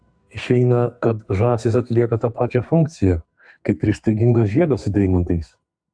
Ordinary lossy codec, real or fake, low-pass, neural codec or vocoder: AAC, 64 kbps; fake; 9.9 kHz; codec, 32 kHz, 1.9 kbps, SNAC